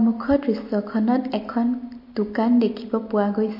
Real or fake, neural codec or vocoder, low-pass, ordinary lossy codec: real; none; 5.4 kHz; MP3, 32 kbps